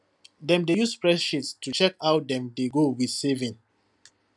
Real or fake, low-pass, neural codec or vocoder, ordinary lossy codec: real; 10.8 kHz; none; none